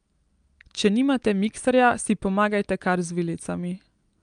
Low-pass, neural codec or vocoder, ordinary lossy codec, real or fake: 9.9 kHz; none; Opus, 32 kbps; real